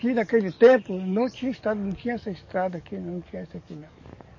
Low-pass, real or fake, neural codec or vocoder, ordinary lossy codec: 7.2 kHz; fake; codec, 44.1 kHz, 7.8 kbps, DAC; MP3, 32 kbps